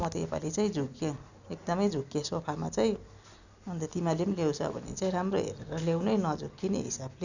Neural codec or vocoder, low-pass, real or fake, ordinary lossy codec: none; 7.2 kHz; real; none